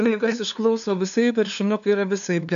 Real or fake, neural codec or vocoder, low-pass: fake; codec, 16 kHz, 2 kbps, FunCodec, trained on LibriTTS, 25 frames a second; 7.2 kHz